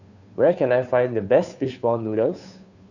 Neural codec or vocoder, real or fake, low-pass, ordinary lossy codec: codec, 16 kHz, 2 kbps, FunCodec, trained on Chinese and English, 25 frames a second; fake; 7.2 kHz; none